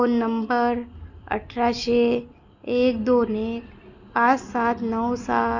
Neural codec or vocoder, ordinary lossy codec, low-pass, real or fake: none; none; 7.2 kHz; real